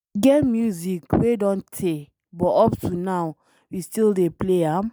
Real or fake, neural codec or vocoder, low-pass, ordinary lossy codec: real; none; none; none